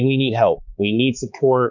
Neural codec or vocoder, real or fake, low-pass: codec, 16 kHz, 4 kbps, X-Codec, HuBERT features, trained on balanced general audio; fake; 7.2 kHz